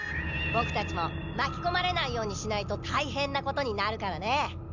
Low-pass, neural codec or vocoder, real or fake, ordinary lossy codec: 7.2 kHz; none; real; none